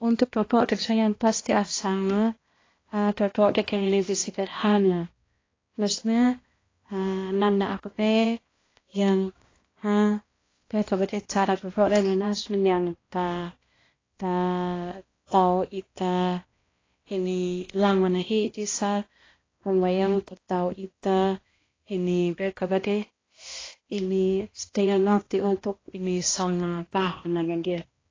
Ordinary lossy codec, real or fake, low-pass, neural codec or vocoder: AAC, 32 kbps; fake; 7.2 kHz; codec, 16 kHz, 1 kbps, X-Codec, HuBERT features, trained on balanced general audio